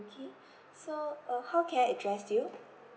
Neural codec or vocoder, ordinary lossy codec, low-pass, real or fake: none; none; none; real